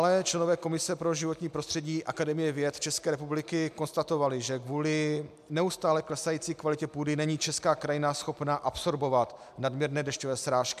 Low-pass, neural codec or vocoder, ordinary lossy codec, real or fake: 14.4 kHz; none; MP3, 96 kbps; real